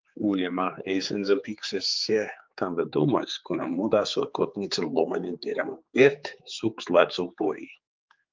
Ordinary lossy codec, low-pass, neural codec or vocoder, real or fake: Opus, 24 kbps; 7.2 kHz; codec, 16 kHz, 4 kbps, X-Codec, HuBERT features, trained on general audio; fake